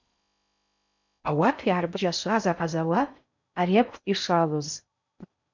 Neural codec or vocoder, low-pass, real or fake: codec, 16 kHz in and 24 kHz out, 0.6 kbps, FocalCodec, streaming, 4096 codes; 7.2 kHz; fake